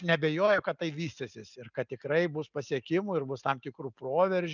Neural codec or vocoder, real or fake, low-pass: none; real; 7.2 kHz